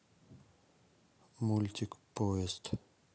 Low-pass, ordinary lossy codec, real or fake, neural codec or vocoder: none; none; real; none